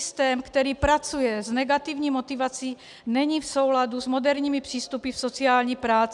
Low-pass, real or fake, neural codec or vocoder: 10.8 kHz; real; none